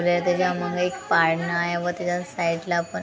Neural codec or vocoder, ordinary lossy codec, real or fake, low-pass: none; none; real; none